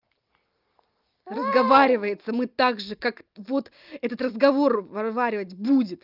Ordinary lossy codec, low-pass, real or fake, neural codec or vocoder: Opus, 24 kbps; 5.4 kHz; real; none